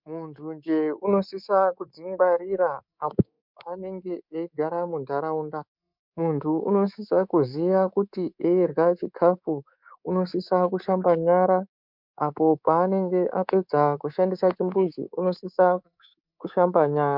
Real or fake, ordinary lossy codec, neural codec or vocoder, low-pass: fake; MP3, 48 kbps; codec, 24 kHz, 3.1 kbps, DualCodec; 5.4 kHz